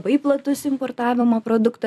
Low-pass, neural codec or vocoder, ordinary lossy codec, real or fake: 14.4 kHz; vocoder, 44.1 kHz, 128 mel bands every 256 samples, BigVGAN v2; AAC, 96 kbps; fake